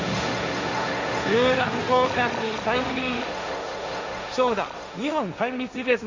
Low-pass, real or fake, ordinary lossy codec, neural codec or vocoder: 7.2 kHz; fake; MP3, 64 kbps; codec, 16 kHz, 1.1 kbps, Voila-Tokenizer